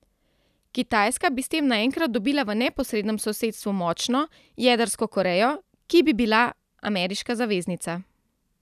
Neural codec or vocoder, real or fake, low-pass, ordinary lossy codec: none; real; 14.4 kHz; none